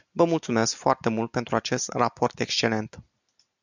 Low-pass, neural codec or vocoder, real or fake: 7.2 kHz; none; real